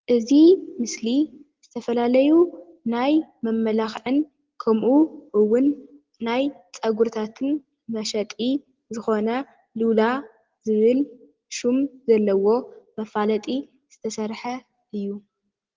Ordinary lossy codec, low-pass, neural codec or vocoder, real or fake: Opus, 16 kbps; 7.2 kHz; none; real